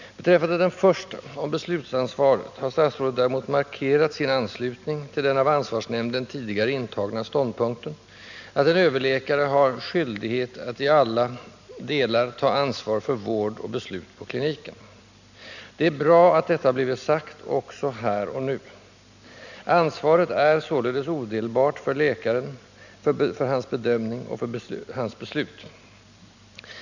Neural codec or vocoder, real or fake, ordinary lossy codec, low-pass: none; real; none; 7.2 kHz